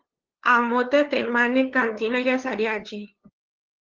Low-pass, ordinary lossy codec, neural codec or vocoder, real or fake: 7.2 kHz; Opus, 16 kbps; codec, 16 kHz, 2 kbps, FunCodec, trained on LibriTTS, 25 frames a second; fake